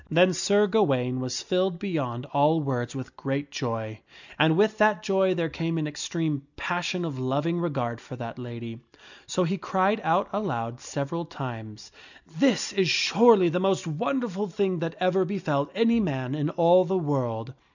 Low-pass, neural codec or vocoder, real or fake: 7.2 kHz; none; real